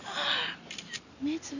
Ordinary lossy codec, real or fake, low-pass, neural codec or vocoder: none; real; 7.2 kHz; none